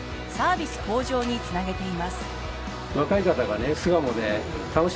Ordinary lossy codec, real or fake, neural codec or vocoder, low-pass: none; real; none; none